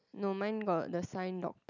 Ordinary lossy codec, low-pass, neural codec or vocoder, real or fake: none; 7.2 kHz; none; real